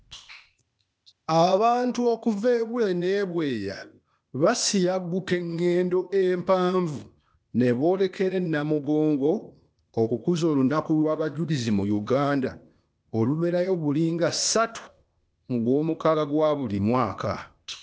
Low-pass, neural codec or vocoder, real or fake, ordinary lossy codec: none; codec, 16 kHz, 0.8 kbps, ZipCodec; fake; none